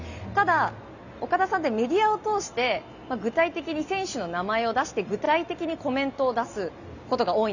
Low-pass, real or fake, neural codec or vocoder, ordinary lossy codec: 7.2 kHz; real; none; none